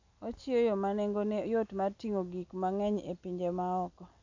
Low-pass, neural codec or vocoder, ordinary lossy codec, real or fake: 7.2 kHz; none; AAC, 48 kbps; real